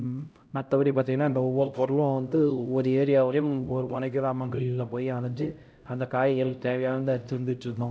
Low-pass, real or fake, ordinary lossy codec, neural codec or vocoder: none; fake; none; codec, 16 kHz, 0.5 kbps, X-Codec, HuBERT features, trained on LibriSpeech